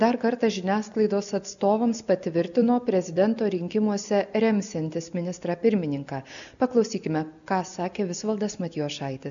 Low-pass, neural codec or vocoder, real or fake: 7.2 kHz; none; real